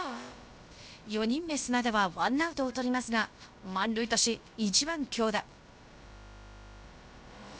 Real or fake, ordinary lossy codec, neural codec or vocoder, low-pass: fake; none; codec, 16 kHz, about 1 kbps, DyCAST, with the encoder's durations; none